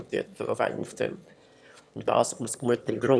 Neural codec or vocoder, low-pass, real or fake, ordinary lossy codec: autoencoder, 22.05 kHz, a latent of 192 numbers a frame, VITS, trained on one speaker; none; fake; none